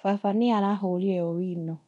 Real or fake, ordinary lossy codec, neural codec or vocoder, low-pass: fake; none; codec, 24 kHz, 0.9 kbps, DualCodec; 10.8 kHz